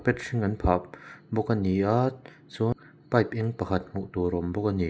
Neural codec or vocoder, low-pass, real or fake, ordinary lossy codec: none; none; real; none